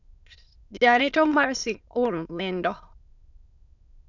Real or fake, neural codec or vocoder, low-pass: fake; autoencoder, 22.05 kHz, a latent of 192 numbers a frame, VITS, trained on many speakers; 7.2 kHz